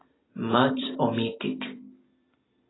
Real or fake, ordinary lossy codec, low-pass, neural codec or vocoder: fake; AAC, 16 kbps; 7.2 kHz; vocoder, 44.1 kHz, 128 mel bands every 256 samples, BigVGAN v2